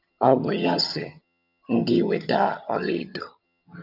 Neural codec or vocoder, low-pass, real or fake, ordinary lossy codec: vocoder, 22.05 kHz, 80 mel bands, HiFi-GAN; 5.4 kHz; fake; none